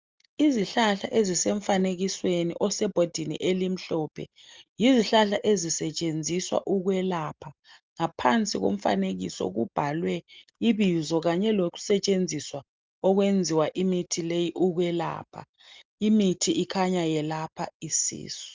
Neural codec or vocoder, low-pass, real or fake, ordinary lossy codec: none; 7.2 kHz; real; Opus, 32 kbps